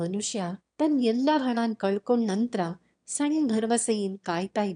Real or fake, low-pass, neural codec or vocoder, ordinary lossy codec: fake; 9.9 kHz; autoencoder, 22.05 kHz, a latent of 192 numbers a frame, VITS, trained on one speaker; none